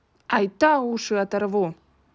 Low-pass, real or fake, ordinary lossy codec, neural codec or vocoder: none; real; none; none